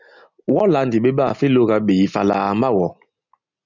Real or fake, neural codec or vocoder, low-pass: real; none; 7.2 kHz